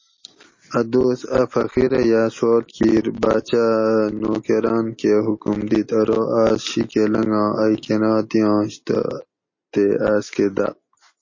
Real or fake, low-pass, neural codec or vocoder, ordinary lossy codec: real; 7.2 kHz; none; MP3, 32 kbps